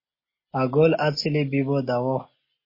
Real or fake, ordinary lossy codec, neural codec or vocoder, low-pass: real; MP3, 24 kbps; none; 5.4 kHz